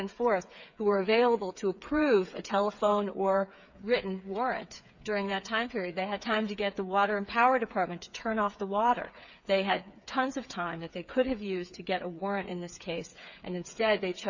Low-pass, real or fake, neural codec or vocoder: 7.2 kHz; fake; codec, 16 kHz, 8 kbps, FreqCodec, smaller model